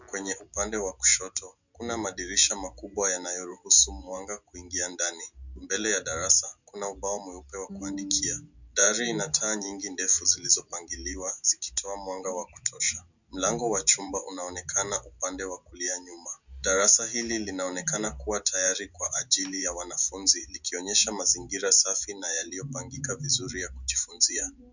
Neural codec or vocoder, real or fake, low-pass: none; real; 7.2 kHz